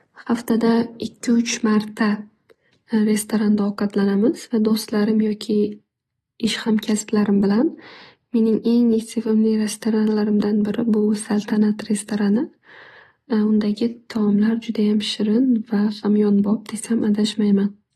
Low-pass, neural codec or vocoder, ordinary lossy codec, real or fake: 19.8 kHz; none; AAC, 32 kbps; real